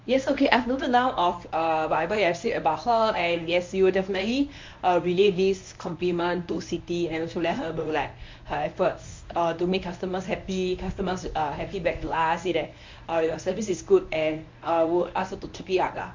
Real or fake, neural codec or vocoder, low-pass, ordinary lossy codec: fake; codec, 24 kHz, 0.9 kbps, WavTokenizer, medium speech release version 1; 7.2 kHz; MP3, 48 kbps